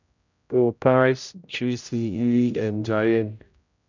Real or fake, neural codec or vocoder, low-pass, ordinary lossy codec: fake; codec, 16 kHz, 0.5 kbps, X-Codec, HuBERT features, trained on general audio; 7.2 kHz; none